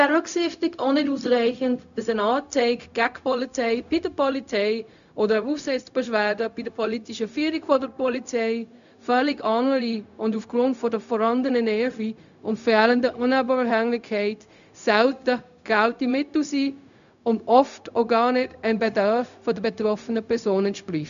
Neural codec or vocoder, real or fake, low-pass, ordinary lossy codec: codec, 16 kHz, 0.4 kbps, LongCat-Audio-Codec; fake; 7.2 kHz; none